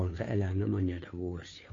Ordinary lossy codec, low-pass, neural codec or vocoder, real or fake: AAC, 32 kbps; 7.2 kHz; codec, 16 kHz, 2 kbps, FunCodec, trained on Chinese and English, 25 frames a second; fake